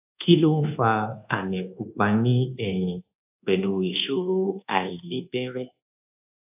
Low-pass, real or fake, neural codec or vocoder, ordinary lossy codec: 3.6 kHz; fake; codec, 24 kHz, 1.2 kbps, DualCodec; AAC, 32 kbps